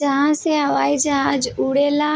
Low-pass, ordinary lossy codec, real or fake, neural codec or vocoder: none; none; real; none